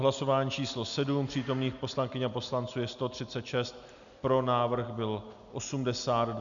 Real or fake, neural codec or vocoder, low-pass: real; none; 7.2 kHz